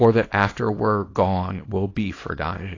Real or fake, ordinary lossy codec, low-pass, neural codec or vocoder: fake; AAC, 32 kbps; 7.2 kHz; codec, 24 kHz, 0.9 kbps, WavTokenizer, small release